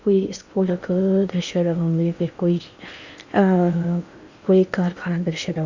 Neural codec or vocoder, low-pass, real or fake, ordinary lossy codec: codec, 16 kHz in and 24 kHz out, 0.8 kbps, FocalCodec, streaming, 65536 codes; 7.2 kHz; fake; Opus, 64 kbps